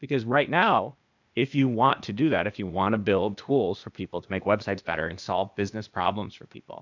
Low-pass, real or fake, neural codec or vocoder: 7.2 kHz; fake; codec, 16 kHz, 0.8 kbps, ZipCodec